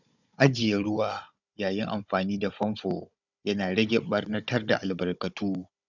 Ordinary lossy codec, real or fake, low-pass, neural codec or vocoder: none; fake; 7.2 kHz; codec, 16 kHz, 16 kbps, FunCodec, trained on Chinese and English, 50 frames a second